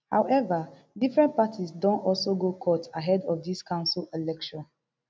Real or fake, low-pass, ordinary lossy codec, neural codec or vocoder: real; none; none; none